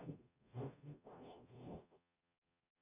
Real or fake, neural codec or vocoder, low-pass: fake; codec, 44.1 kHz, 0.9 kbps, DAC; 3.6 kHz